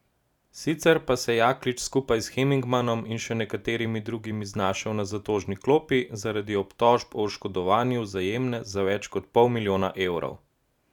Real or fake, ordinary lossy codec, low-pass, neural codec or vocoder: real; none; 19.8 kHz; none